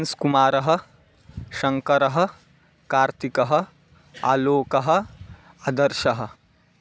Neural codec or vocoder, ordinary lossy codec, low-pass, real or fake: none; none; none; real